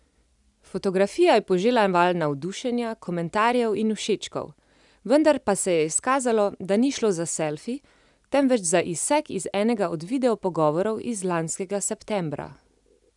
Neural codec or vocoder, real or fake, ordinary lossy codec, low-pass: none; real; none; 10.8 kHz